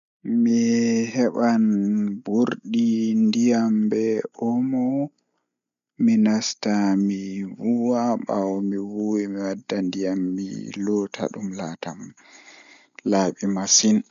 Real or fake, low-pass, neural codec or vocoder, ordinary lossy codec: real; 7.2 kHz; none; none